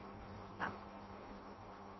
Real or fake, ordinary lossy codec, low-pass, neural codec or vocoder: fake; MP3, 24 kbps; 7.2 kHz; codec, 16 kHz in and 24 kHz out, 0.6 kbps, FireRedTTS-2 codec